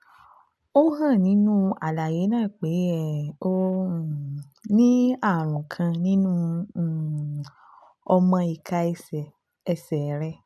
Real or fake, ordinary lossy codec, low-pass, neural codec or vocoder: real; none; none; none